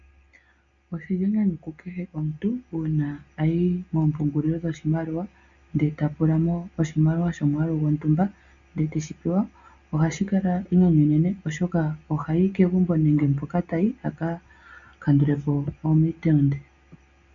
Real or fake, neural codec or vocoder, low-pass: real; none; 7.2 kHz